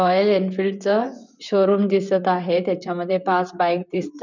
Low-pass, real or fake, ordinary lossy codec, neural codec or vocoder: 7.2 kHz; fake; none; vocoder, 44.1 kHz, 128 mel bands, Pupu-Vocoder